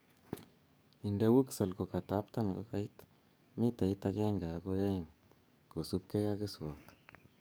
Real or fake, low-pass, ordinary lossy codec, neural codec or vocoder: fake; none; none; codec, 44.1 kHz, 7.8 kbps, DAC